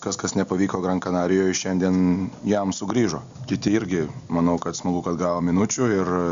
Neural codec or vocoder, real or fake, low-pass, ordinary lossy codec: none; real; 7.2 kHz; Opus, 64 kbps